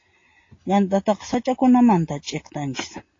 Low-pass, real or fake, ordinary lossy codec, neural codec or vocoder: 7.2 kHz; real; AAC, 32 kbps; none